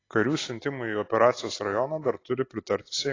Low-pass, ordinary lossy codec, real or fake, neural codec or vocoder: 7.2 kHz; AAC, 32 kbps; real; none